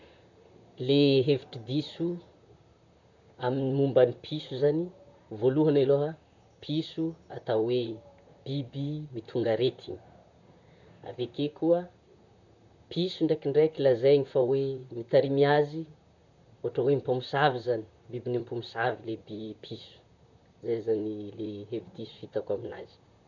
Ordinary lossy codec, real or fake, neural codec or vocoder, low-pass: none; fake; vocoder, 44.1 kHz, 128 mel bands, Pupu-Vocoder; 7.2 kHz